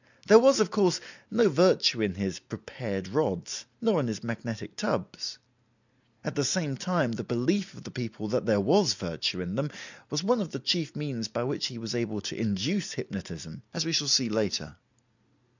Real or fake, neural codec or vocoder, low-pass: real; none; 7.2 kHz